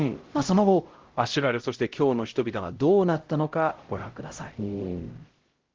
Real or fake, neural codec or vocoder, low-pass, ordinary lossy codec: fake; codec, 16 kHz, 0.5 kbps, X-Codec, HuBERT features, trained on LibriSpeech; 7.2 kHz; Opus, 16 kbps